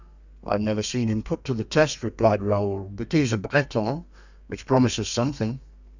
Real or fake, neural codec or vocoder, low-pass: fake; codec, 32 kHz, 1.9 kbps, SNAC; 7.2 kHz